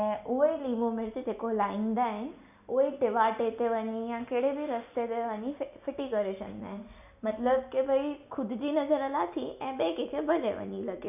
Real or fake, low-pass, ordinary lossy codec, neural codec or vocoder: real; 3.6 kHz; none; none